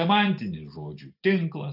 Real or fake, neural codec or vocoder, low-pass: real; none; 5.4 kHz